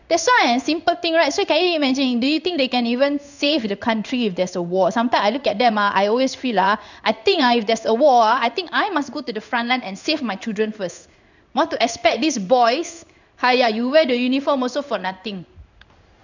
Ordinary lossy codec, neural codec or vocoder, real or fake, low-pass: none; codec, 16 kHz in and 24 kHz out, 1 kbps, XY-Tokenizer; fake; 7.2 kHz